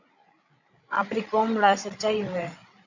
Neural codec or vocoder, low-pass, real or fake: codec, 16 kHz, 16 kbps, FreqCodec, larger model; 7.2 kHz; fake